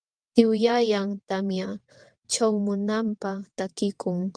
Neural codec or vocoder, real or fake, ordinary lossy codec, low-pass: vocoder, 22.05 kHz, 80 mel bands, WaveNeXt; fake; Opus, 32 kbps; 9.9 kHz